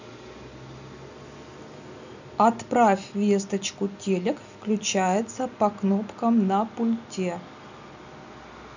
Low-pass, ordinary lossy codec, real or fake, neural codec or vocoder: 7.2 kHz; none; real; none